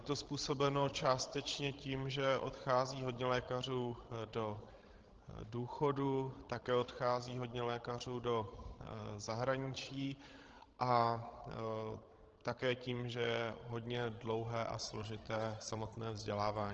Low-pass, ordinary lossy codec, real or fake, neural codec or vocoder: 7.2 kHz; Opus, 16 kbps; fake; codec, 16 kHz, 16 kbps, FreqCodec, larger model